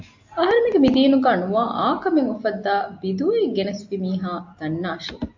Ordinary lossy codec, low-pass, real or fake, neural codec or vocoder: MP3, 48 kbps; 7.2 kHz; real; none